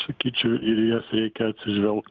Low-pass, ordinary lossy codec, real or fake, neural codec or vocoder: 7.2 kHz; Opus, 32 kbps; real; none